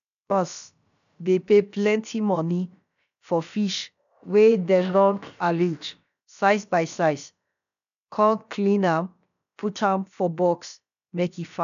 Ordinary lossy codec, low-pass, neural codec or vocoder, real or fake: none; 7.2 kHz; codec, 16 kHz, about 1 kbps, DyCAST, with the encoder's durations; fake